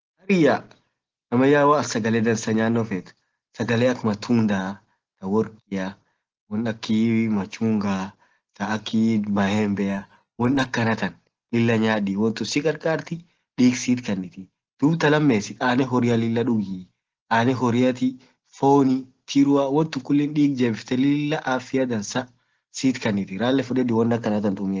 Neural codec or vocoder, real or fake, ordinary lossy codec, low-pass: none; real; Opus, 16 kbps; 7.2 kHz